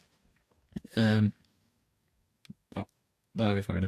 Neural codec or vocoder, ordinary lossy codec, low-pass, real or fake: codec, 44.1 kHz, 2.6 kbps, DAC; AAC, 64 kbps; 14.4 kHz; fake